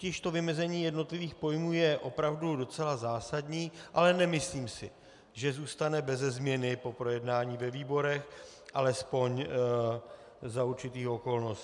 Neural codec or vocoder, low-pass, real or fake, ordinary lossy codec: none; 10.8 kHz; real; MP3, 96 kbps